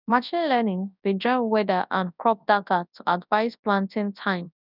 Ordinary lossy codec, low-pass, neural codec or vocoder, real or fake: none; 5.4 kHz; codec, 24 kHz, 0.9 kbps, WavTokenizer, large speech release; fake